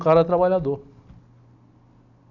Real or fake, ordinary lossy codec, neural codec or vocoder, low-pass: real; none; none; 7.2 kHz